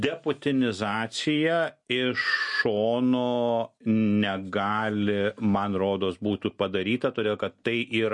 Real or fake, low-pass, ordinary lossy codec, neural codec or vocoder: real; 10.8 kHz; MP3, 48 kbps; none